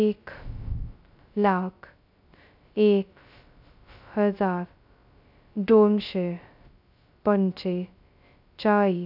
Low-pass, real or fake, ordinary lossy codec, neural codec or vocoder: 5.4 kHz; fake; none; codec, 16 kHz, 0.2 kbps, FocalCodec